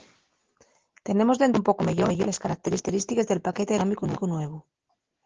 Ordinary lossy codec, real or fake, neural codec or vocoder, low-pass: Opus, 16 kbps; real; none; 7.2 kHz